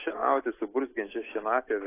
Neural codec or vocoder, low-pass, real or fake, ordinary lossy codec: none; 3.6 kHz; real; AAC, 16 kbps